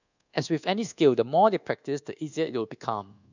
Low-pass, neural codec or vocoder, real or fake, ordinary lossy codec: 7.2 kHz; codec, 24 kHz, 1.2 kbps, DualCodec; fake; none